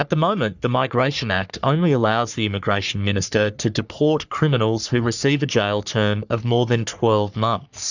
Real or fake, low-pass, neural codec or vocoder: fake; 7.2 kHz; codec, 44.1 kHz, 3.4 kbps, Pupu-Codec